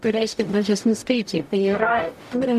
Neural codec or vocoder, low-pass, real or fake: codec, 44.1 kHz, 0.9 kbps, DAC; 14.4 kHz; fake